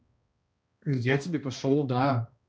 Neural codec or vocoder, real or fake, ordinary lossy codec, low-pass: codec, 16 kHz, 1 kbps, X-Codec, HuBERT features, trained on balanced general audio; fake; none; none